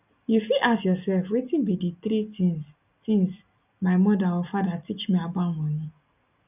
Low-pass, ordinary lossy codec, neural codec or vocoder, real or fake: 3.6 kHz; none; none; real